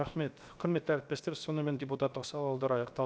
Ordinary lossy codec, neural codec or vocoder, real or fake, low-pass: none; codec, 16 kHz, 0.7 kbps, FocalCodec; fake; none